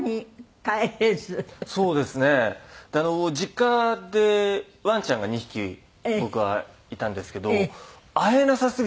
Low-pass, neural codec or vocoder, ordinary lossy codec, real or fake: none; none; none; real